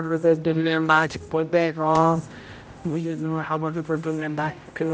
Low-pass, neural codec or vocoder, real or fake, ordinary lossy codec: none; codec, 16 kHz, 0.5 kbps, X-Codec, HuBERT features, trained on general audio; fake; none